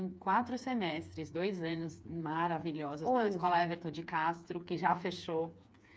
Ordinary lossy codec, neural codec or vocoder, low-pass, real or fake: none; codec, 16 kHz, 4 kbps, FreqCodec, smaller model; none; fake